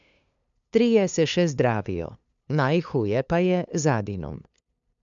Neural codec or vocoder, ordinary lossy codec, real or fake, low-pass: codec, 16 kHz, 2 kbps, FunCodec, trained on LibriTTS, 25 frames a second; none; fake; 7.2 kHz